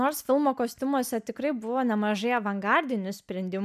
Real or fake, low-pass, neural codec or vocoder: real; 14.4 kHz; none